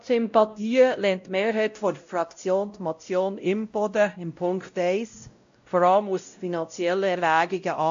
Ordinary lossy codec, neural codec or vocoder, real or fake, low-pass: AAC, 64 kbps; codec, 16 kHz, 0.5 kbps, X-Codec, WavLM features, trained on Multilingual LibriSpeech; fake; 7.2 kHz